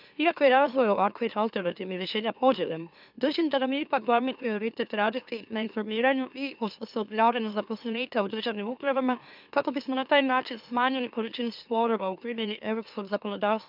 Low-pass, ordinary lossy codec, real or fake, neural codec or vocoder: 5.4 kHz; none; fake; autoencoder, 44.1 kHz, a latent of 192 numbers a frame, MeloTTS